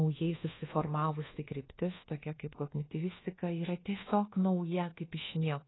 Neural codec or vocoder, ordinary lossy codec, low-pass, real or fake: codec, 24 kHz, 1.2 kbps, DualCodec; AAC, 16 kbps; 7.2 kHz; fake